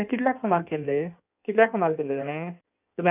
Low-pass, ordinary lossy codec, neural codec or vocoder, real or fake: 3.6 kHz; none; codec, 16 kHz in and 24 kHz out, 1.1 kbps, FireRedTTS-2 codec; fake